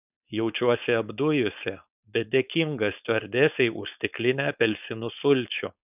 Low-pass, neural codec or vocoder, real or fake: 3.6 kHz; codec, 16 kHz, 4.8 kbps, FACodec; fake